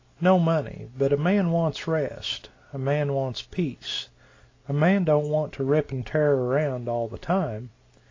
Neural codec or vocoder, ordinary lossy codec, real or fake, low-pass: none; AAC, 32 kbps; real; 7.2 kHz